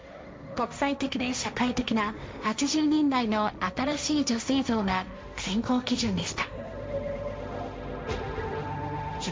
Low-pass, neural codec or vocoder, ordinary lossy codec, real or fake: none; codec, 16 kHz, 1.1 kbps, Voila-Tokenizer; none; fake